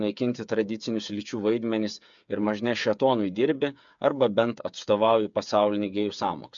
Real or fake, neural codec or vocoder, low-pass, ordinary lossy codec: fake; codec, 16 kHz, 8 kbps, FreqCodec, smaller model; 7.2 kHz; MP3, 64 kbps